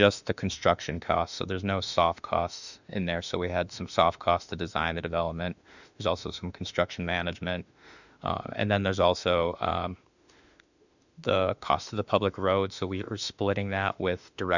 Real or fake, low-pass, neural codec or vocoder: fake; 7.2 kHz; autoencoder, 48 kHz, 32 numbers a frame, DAC-VAE, trained on Japanese speech